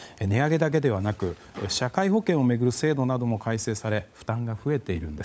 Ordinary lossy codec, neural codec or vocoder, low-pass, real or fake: none; codec, 16 kHz, 16 kbps, FunCodec, trained on LibriTTS, 50 frames a second; none; fake